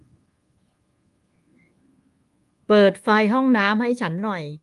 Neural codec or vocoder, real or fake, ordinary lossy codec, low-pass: codec, 24 kHz, 1.2 kbps, DualCodec; fake; Opus, 24 kbps; 10.8 kHz